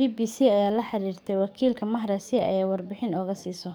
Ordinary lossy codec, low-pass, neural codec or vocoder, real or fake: none; none; codec, 44.1 kHz, 7.8 kbps, DAC; fake